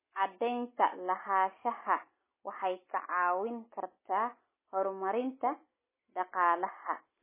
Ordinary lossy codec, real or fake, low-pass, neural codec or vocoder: MP3, 16 kbps; real; 3.6 kHz; none